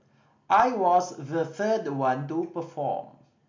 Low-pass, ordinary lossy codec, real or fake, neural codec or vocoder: 7.2 kHz; MP3, 48 kbps; real; none